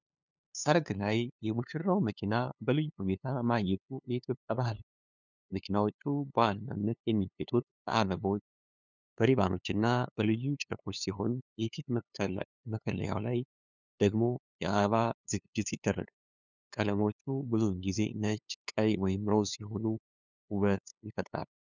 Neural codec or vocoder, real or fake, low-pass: codec, 16 kHz, 2 kbps, FunCodec, trained on LibriTTS, 25 frames a second; fake; 7.2 kHz